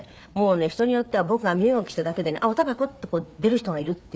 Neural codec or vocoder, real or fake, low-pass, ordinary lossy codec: codec, 16 kHz, 4 kbps, FreqCodec, larger model; fake; none; none